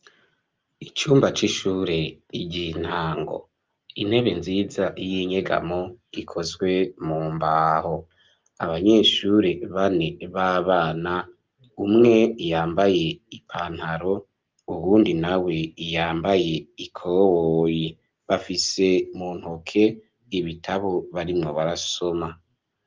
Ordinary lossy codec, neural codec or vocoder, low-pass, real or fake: Opus, 32 kbps; none; 7.2 kHz; real